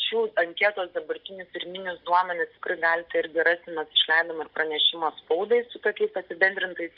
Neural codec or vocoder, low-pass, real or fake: none; 5.4 kHz; real